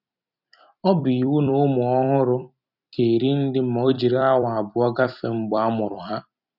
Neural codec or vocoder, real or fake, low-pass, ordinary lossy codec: vocoder, 44.1 kHz, 128 mel bands every 256 samples, BigVGAN v2; fake; 5.4 kHz; none